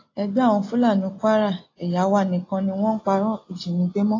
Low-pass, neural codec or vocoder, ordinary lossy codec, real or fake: 7.2 kHz; none; AAC, 32 kbps; real